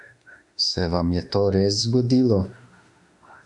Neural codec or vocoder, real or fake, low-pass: autoencoder, 48 kHz, 32 numbers a frame, DAC-VAE, trained on Japanese speech; fake; 10.8 kHz